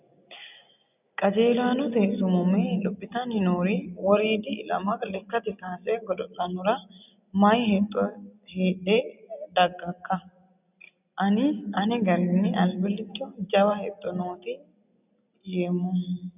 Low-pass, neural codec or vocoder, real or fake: 3.6 kHz; none; real